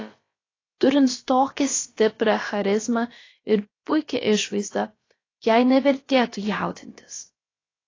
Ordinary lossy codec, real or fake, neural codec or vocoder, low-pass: AAC, 32 kbps; fake; codec, 16 kHz, about 1 kbps, DyCAST, with the encoder's durations; 7.2 kHz